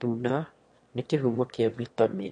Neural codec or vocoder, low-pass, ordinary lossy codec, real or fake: autoencoder, 22.05 kHz, a latent of 192 numbers a frame, VITS, trained on one speaker; 9.9 kHz; MP3, 48 kbps; fake